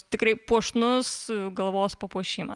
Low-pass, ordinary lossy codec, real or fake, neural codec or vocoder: 10.8 kHz; Opus, 32 kbps; real; none